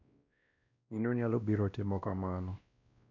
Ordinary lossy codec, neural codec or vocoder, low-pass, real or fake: none; codec, 16 kHz, 1 kbps, X-Codec, WavLM features, trained on Multilingual LibriSpeech; 7.2 kHz; fake